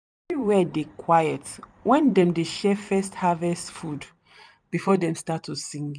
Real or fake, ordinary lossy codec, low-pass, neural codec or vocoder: fake; none; 9.9 kHz; vocoder, 44.1 kHz, 128 mel bands every 256 samples, BigVGAN v2